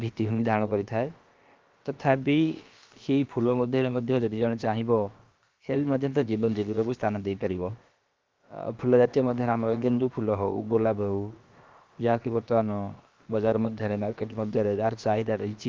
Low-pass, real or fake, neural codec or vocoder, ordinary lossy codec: 7.2 kHz; fake; codec, 16 kHz, about 1 kbps, DyCAST, with the encoder's durations; Opus, 32 kbps